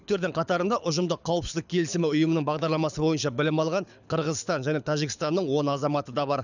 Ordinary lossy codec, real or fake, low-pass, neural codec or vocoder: none; fake; 7.2 kHz; codec, 24 kHz, 6 kbps, HILCodec